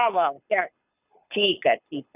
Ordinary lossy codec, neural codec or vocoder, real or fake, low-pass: none; codec, 24 kHz, 6 kbps, HILCodec; fake; 3.6 kHz